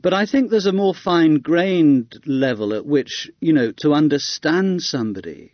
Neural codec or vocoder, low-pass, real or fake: none; 7.2 kHz; real